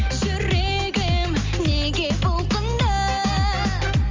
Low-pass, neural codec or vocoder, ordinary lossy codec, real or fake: 7.2 kHz; none; Opus, 32 kbps; real